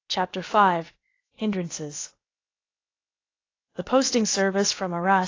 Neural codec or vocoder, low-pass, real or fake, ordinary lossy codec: codec, 16 kHz, about 1 kbps, DyCAST, with the encoder's durations; 7.2 kHz; fake; AAC, 32 kbps